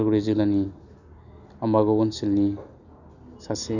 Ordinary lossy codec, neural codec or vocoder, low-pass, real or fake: none; none; 7.2 kHz; real